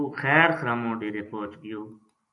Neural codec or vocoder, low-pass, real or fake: vocoder, 44.1 kHz, 128 mel bands every 256 samples, BigVGAN v2; 10.8 kHz; fake